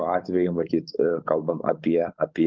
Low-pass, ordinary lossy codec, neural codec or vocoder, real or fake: 7.2 kHz; Opus, 32 kbps; codec, 16 kHz, 4.8 kbps, FACodec; fake